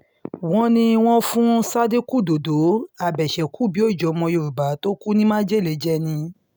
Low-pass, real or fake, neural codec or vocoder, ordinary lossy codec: none; real; none; none